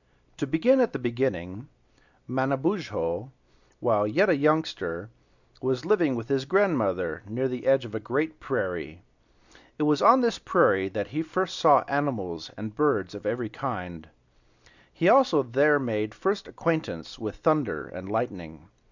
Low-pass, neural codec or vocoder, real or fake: 7.2 kHz; none; real